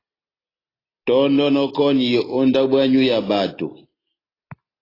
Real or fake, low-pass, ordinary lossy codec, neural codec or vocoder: real; 5.4 kHz; AAC, 24 kbps; none